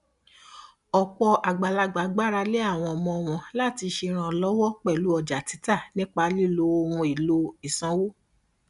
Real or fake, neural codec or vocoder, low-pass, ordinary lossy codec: real; none; 10.8 kHz; none